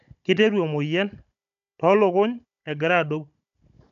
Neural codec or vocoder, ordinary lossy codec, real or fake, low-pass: codec, 16 kHz, 16 kbps, FunCodec, trained on Chinese and English, 50 frames a second; none; fake; 7.2 kHz